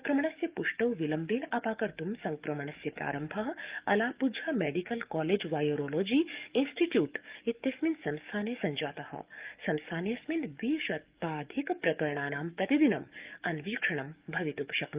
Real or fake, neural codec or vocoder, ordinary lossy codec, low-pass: fake; codec, 44.1 kHz, 7.8 kbps, DAC; Opus, 32 kbps; 3.6 kHz